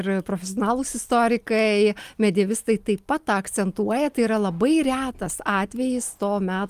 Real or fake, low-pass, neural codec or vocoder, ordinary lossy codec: real; 14.4 kHz; none; Opus, 32 kbps